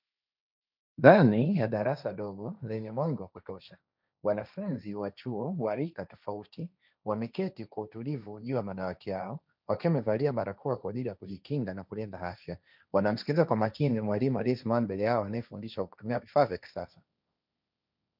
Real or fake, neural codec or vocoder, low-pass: fake; codec, 16 kHz, 1.1 kbps, Voila-Tokenizer; 5.4 kHz